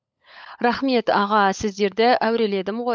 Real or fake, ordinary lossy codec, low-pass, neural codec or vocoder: fake; none; none; codec, 16 kHz, 16 kbps, FunCodec, trained on LibriTTS, 50 frames a second